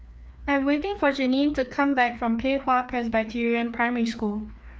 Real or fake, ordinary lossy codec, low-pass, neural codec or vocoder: fake; none; none; codec, 16 kHz, 2 kbps, FreqCodec, larger model